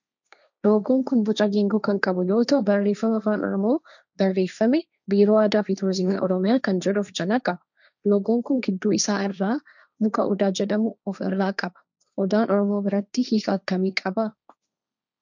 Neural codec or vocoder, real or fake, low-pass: codec, 16 kHz, 1.1 kbps, Voila-Tokenizer; fake; 7.2 kHz